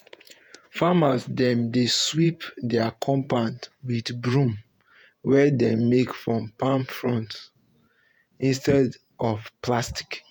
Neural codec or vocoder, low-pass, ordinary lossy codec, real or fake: vocoder, 48 kHz, 128 mel bands, Vocos; none; none; fake